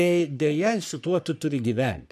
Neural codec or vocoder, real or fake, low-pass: codec, 44.1 kHz, 3.4 kbps, Pupu-Codec; fake; 14.4 kHz